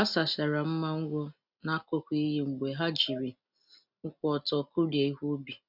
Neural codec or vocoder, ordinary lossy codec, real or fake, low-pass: none; none; real; 5.4 kHz